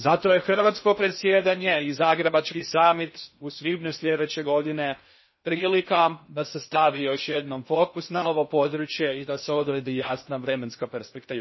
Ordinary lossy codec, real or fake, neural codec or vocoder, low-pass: MP3, 24 kbps; fake; codec, 16 kHz in and 24 kHz out, 0.6 kbps, FocalCodec, streaming, 2048 codes; 7.2 kHz